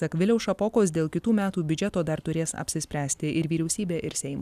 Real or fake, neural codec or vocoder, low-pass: real; none; 14.4 kHz